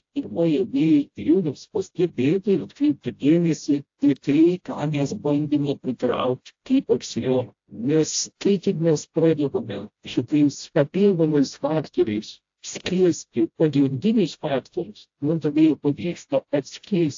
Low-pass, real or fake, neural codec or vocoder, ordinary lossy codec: 7.2 kHz; fake; codec, 16 kHz, 0.5 kbps, FreqCodec, smaller model; AAC, 48 kbps